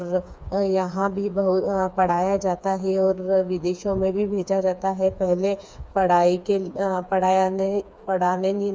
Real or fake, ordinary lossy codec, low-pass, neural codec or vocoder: fake; none; none; codec, 16 kHz, 4 kbps, FreqCodec, smaller model